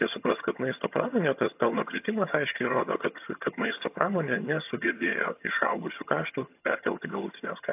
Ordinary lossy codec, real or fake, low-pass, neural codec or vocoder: AAC, 32 kbps; fake; 3.6 kHz; vocoder, 22.05 kHz, 80 mel bands, HiFi-GAN